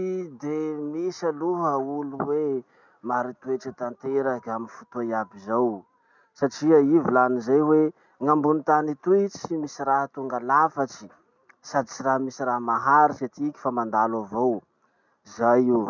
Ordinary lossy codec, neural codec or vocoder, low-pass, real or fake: none; none; 7.2 kHz; real